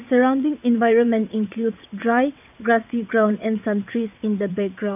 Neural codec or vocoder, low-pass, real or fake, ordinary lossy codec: vocoder, 22.05 kHz, 80 mel bands, WaveNeXt; 3.6 kHz; fake; none